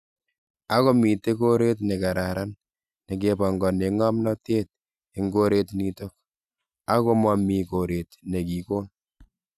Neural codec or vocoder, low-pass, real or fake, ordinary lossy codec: none; 14.4 kHz; real; none